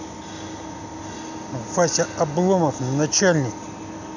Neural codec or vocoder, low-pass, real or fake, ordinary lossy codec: none; 7.2 kHz; real; none